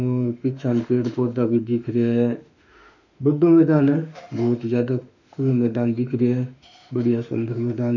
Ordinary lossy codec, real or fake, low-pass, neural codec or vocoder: none; fake; 7.2 kHz; autoencoder, 48 kHz, 32 numbers a frame, DAC-VAE, trained on Japanese speech